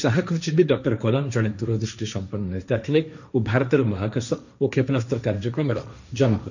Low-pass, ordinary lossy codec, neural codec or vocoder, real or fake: 7.2 kHz; none; codec, 16 kHz, 1.1 kbps, Voila-Tokenizer; fake